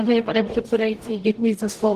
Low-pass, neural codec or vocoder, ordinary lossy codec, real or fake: 14.4 kHz; codec, 44.1 kHz, 0.9 kbps, DAC; Opus, 16 kbps; fake